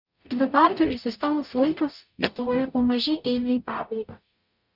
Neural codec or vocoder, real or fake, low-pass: codec, 44.1 kHz, 0.9 kbps, DAC; fake; 5.4 kHz